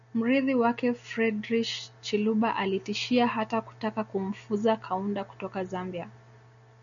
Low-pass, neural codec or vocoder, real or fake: 7.2 kHz; none; real